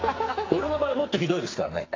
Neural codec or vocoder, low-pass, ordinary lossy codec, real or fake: codec, 44.1 kHz, 2.6 kbps, SNAC; 7.2 kHz; AAC, 32 kbps; fake